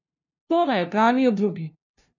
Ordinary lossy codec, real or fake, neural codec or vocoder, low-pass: none; fake; codec, 16 kHz, 0.5 kbps, FunCodec, trained on LibriTTS, 25 frames a second; 7.2 kHz